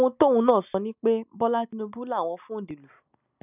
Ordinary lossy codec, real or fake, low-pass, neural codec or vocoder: none; real; 3.6 kHz; none